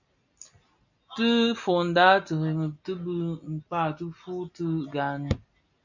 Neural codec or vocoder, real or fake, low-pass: none; real; 7.2 kHz